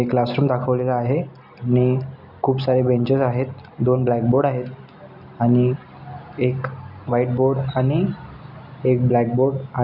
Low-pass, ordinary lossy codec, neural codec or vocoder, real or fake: 5.4 kHz; none; none; real